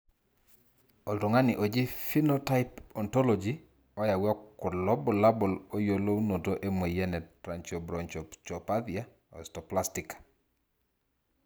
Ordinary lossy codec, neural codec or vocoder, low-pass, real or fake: none; none; none; real